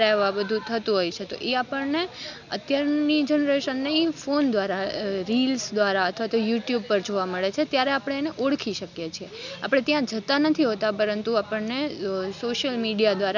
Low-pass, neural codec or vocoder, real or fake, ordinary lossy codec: 7.2 kHz; none; real; none